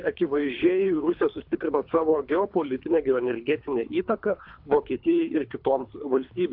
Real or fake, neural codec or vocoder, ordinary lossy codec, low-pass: fake; codec, 24 kHz, 3 kbps, HILCodec; AAC, 48 kbps; 5.4 kHz